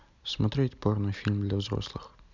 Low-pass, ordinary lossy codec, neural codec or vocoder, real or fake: 7.2 kHz; none; none; real